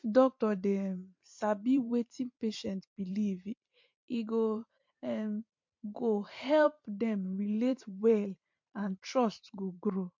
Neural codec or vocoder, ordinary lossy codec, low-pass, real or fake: vocoder, 44.1 kHz, 128 mel bands every 512 samples, BigVGAN v2; MP3, 48 kbps; 7.2 kHz; fake